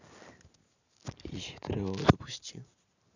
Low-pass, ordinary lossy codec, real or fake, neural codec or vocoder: 7.2 kHz; none; real; none